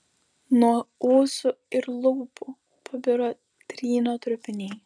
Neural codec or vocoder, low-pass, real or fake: none; 9.9 kHz; real